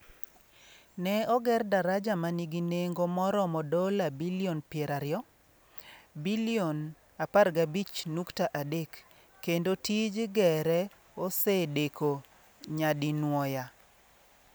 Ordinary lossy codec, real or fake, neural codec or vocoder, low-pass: none; real; none; none